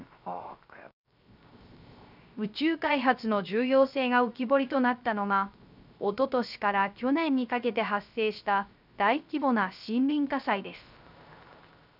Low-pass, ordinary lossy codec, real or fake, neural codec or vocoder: 5.4 kHz; none; fake; codec, 16 kHz, 0.3 kbps, FocalCodec